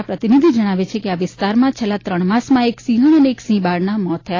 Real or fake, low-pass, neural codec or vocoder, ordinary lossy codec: real; 7.2 kHz; none; AAC, 32 kbps